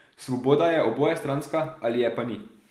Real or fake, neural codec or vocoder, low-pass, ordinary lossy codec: real; none; 14.4 kHz; Opus, 24 kbps